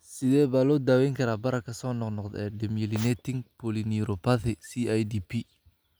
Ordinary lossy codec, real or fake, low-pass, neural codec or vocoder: none; real; none; none